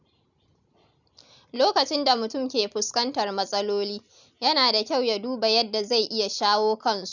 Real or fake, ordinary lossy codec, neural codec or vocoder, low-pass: real; none; none; 7.2 kHz